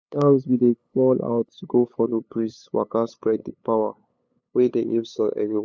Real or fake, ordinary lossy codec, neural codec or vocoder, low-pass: fake; none; codec, 16 kHz, 8 kbps, FunCodec, trained on LibriTTS, 25 frames a second; none